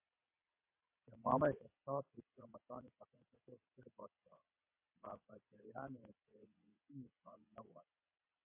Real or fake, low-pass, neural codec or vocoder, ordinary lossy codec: fake; 3.6 kHz; vocoder, 22.05 kHz, 80 mel bands, WaveNeXt; MP3, 24 kbps